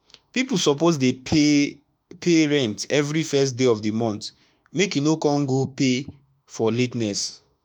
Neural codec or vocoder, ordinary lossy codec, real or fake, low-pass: autoencoder, 48 kHz, 32 numbers a frame, DAC-VAE, trained on Japanese speech; none; fake; 19.8 kHz